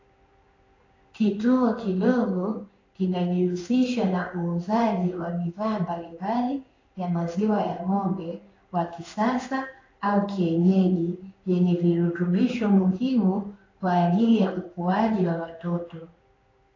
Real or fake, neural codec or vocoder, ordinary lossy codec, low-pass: fake; codec, 16 kHz in and 24 kHz out, 1 kbps, XY-Tokenizer; AAC, 32 kbps; 7.2 kHz